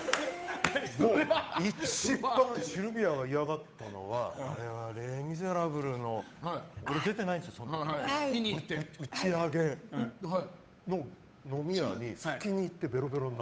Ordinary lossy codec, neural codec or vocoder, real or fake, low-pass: none; codec, 16 kHz, 8 kbps, FunCodec, trained on Chinese and English, 25 frames a second; fake; none